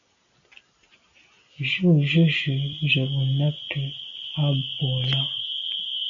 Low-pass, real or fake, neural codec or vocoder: 7.2 kHz; real; none